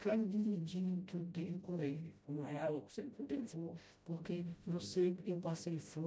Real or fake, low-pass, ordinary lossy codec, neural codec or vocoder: fake; none; none; codec, 16 kHz, 0.5 kbps, FreqCodec, smaller model